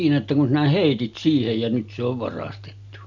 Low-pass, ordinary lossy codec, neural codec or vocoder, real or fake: 7.2 kHz; none; none; real